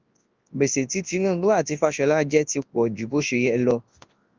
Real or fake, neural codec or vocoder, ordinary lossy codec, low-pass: fake; codec, 24 kHz, 0.9 kbps, WavTokenizer, large speech release; Opus, 24 kbps; 7.2 kHz